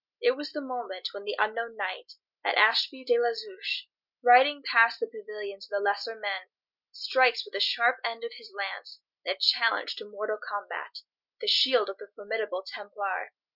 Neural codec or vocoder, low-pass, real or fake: none; 5.4 kHz; real